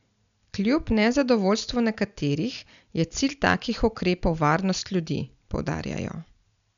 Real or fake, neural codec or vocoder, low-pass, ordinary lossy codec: real; none; 7.2 kHz; MP3, 96 kbps